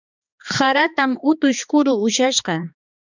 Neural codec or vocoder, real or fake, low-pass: codec, 16 kHz, 2 kbps, X-Codec, HuBERT features, trained on balanced general audio; fake; 7.2 kHz